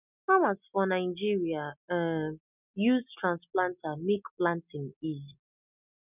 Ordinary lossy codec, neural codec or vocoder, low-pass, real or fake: none; none; 3.6 kHz; real